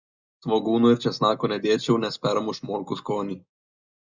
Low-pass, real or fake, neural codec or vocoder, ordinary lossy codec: 7.2 kHz; real; none; Opus, 64 kbps